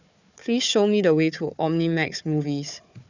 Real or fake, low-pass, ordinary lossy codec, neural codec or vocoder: fake; 7.2 kHz; none; codec, 16 kHz, 4 kbps, FunCodec, trained on Chinese and English, 50 frames a second